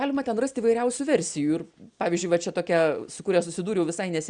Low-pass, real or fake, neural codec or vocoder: 9.9 kHz; real; none